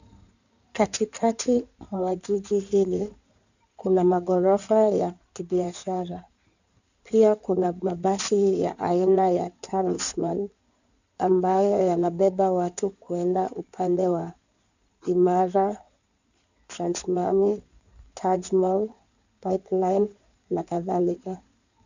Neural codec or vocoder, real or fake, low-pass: codec, 16 kHz in and 24 kHz out, 2.2 kbps, FireRedTTS-2 codec; fake; 7.2 kHz